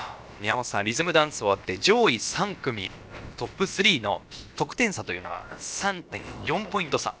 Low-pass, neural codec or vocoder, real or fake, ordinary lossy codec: none; codec, 16 kHz, about 1 kbps, DyCAST, with the encoder's durations; fake; none